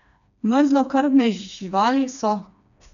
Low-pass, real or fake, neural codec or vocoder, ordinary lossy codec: 7.2 kHz; fake; codec, 16 kHz, 2 kbps, FreqCodec, smaller model; none